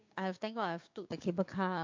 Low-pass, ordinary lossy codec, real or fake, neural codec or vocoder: 7.2 kHz; MP3, 48 kbps; fake; codec, 16 kHz, 6 kbps, DAC